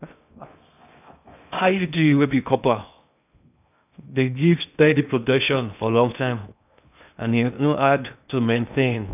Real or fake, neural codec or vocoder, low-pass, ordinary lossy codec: fake; codec, 16 kHz in and 24 kHz out, 0.6 kbps, FocalCodec, streaming, 2048 codes; 3.6 kHz; none